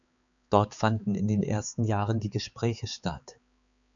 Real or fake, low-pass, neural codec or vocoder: fake; 7.2 kHz; codec, 16 kHz, 4 kbps, X-Codec, HuBERT features, trained on balanced general audio